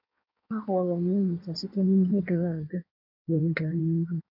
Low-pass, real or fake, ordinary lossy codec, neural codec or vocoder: 5.4 kHz; fake; none; codec, 16 kHz in and 24 kHz out, 1.1 kbps, FireRedTTS-2 codec